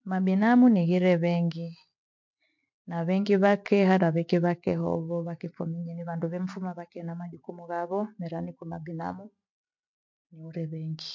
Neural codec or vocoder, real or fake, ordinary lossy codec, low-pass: none; real; MP3, 48 kbps; 7.2 kHz